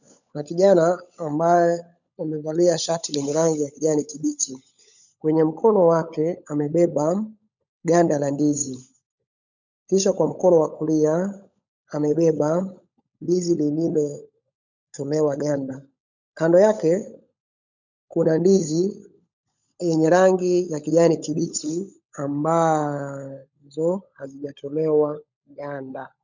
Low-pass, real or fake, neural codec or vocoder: 7.2 kHz; fake; codec, 16 kHz, 16 kbps, FunCodec, trained on LibriTTS, 50 frames a second